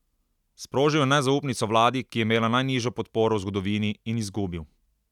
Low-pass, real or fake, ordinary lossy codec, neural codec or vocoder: 19.8 kHz; real; none; none